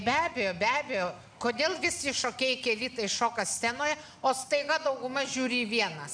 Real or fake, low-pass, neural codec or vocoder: real; 9.9 kHz; none